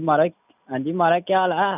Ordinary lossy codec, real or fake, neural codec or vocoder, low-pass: none; real; none; 3.6 kHz